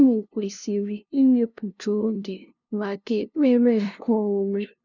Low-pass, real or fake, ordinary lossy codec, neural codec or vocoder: 7.2 kHz; fake; none; codec, 16 kHz, 0.5 kbps, FunCodec, trained on LibriTTS, 25 frames a second